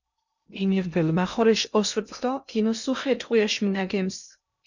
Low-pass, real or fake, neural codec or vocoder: 7.2 kHz; fake; codec, 16 kHz in and 24 kHz out, 0.6 kbps, FocalCodec, streaming, 2048 codes